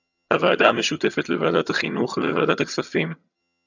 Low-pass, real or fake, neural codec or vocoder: 7.2 kHz; fake; vocoder, 22.05 kHz, 80 mel bands, HiFi-GAN